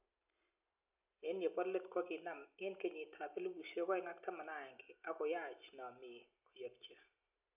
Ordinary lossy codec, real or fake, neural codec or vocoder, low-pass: none; real; none; 3.6 kHz